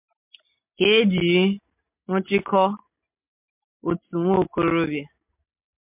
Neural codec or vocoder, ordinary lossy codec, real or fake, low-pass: none; MP3, 32 kbps; real; 3.6 kHz